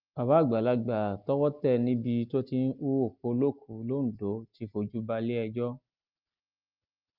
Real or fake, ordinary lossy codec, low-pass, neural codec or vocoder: real; Opus, 24 kbps; 5.4 kHz; none